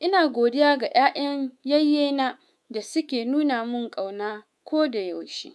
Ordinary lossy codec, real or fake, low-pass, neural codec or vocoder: none; fake; none; codec, 24 kHz, 3.1 kbps, DualCodec